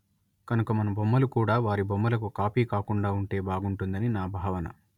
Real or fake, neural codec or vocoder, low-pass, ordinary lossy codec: real; none; 19.8 kHz; none